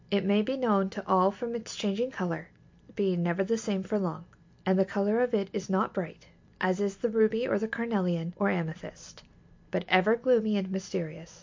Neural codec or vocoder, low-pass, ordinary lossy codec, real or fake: none; 7.2 kHz; MP3, 48 kbps; real